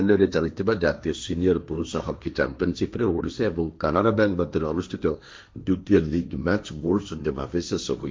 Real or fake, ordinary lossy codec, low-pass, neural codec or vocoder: fake; none; none; codec, 16 kHz, 1.1 kbps, Voila-Tokenizer